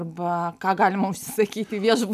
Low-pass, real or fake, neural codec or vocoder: 14.4 kHz; real; none